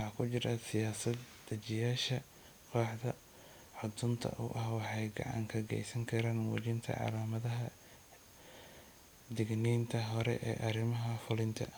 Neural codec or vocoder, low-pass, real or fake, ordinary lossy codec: none; none; real; none